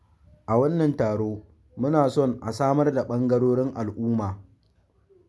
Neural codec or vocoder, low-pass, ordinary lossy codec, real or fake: none; none; none; real